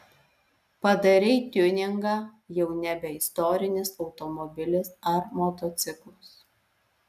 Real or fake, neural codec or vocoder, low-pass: real; none; 14.4 kHz